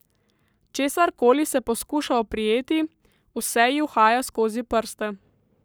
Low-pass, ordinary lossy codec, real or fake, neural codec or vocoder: none; none; fake; codec, 44.1 kHz, 7.8 kbps, Pupu-Codec